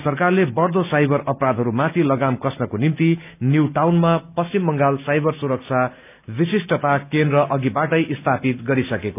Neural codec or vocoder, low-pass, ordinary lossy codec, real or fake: none; 3.6 kHz; none; real